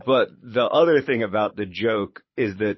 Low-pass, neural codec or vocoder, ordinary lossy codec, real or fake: 7.2 kHz; codec, 24 kHz, 6 kbps, HILCodec; MP3, 24 kbps; fake